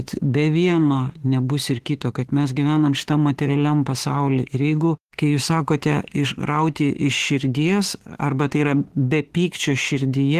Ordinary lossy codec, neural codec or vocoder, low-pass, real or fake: Opus, 16 kbps; autoencoder, 48 kHz, 32 numbers a frame, DAC-VAE, trained on Japanese speech; 14.4 kHz; fake